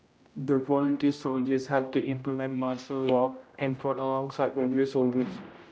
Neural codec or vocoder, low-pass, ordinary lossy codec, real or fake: codec, 16 kHz, 0.5 kbps, X-Codec, HuBERT features, trained on general audio; none; none; fake